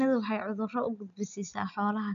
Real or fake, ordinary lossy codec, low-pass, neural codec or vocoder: real; MP3, 64 kbps; 7.2 kHz; none